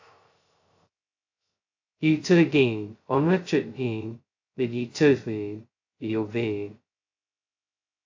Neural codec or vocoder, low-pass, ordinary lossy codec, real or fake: codec, 16 kHz, 0.2 kbps, FocalCodec; 7.2 kHz; AAC, 48 kbps; fake